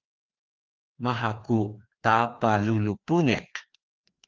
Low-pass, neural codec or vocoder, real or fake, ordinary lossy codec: 7.2 kHz; codec, 16 kHz, 2 kbps, FreqCodec, larger model; fake; Opus, 32 kbps